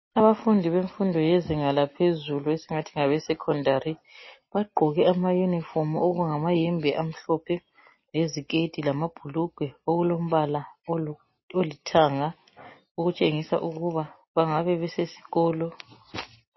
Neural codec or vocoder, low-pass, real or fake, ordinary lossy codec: none; 7.2 kHz; real; MP3, 24 kbps